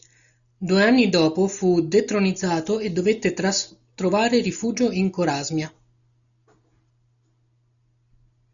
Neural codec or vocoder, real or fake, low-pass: none; real; 7.2 kHz